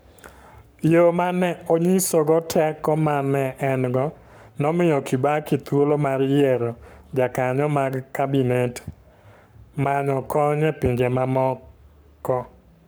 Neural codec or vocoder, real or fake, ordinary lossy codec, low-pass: codec, 44.1 kHz, 7.8 kbps, Pupu-Codec; fake; none; none